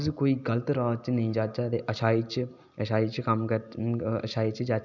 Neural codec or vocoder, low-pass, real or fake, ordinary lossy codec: none; 7.2 kHz; real; none